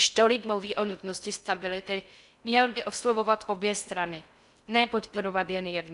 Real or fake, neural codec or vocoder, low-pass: fake; codec, 16 kHz in and 24 kHz out, 0.6 kbps, FocalCodec, streaming, 4096 codes; 10.8 kHz